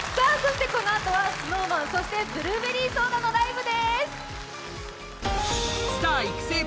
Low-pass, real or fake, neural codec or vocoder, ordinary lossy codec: none; real; none; none